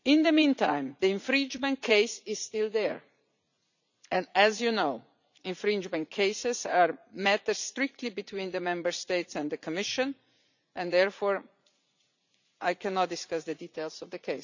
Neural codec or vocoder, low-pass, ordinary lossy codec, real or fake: vocoder, 44.1 kHz, 80 mel bands, Vocos; 7.2 kHz; none; fake